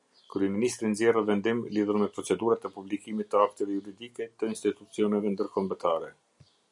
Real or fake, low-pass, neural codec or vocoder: real; 10.8 kHz; none